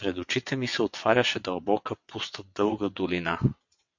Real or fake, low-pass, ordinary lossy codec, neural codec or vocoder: fake; 7.2 kHz; MP3, 48 kbps; vocoder, 22.05 kHz, 80 mel bands, WaveNeXt